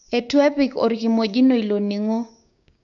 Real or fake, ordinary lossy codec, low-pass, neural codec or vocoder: fake; none; 7.2 kHz; codec, 16 kHz, 6 kbps, DAC